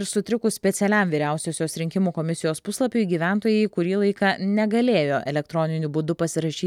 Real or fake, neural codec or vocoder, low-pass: real; none; 19.8 kHz